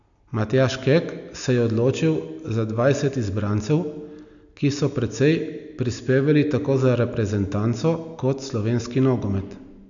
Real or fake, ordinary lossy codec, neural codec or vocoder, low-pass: real; none; none; 7.2 kHz